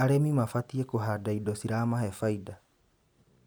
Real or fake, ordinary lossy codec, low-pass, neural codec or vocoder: real; none; none; none